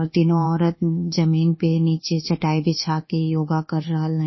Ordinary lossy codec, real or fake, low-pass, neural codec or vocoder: MP3, 24 kbps; fake; 7.2 kHz; codec, 24 kHz, 1.2 kbps, DualCodec